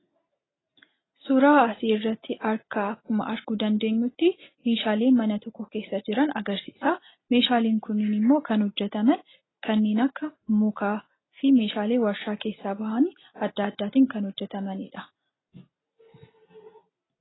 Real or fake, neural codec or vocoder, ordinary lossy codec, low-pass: real; none; AAC, 16 kbps; 7.2 kHz